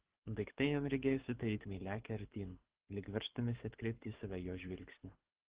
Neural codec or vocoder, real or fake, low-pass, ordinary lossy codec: codec, 24 kHz, 6 kbps, HILCodec; fake; 3.6 kHz; Opus, 16 kbps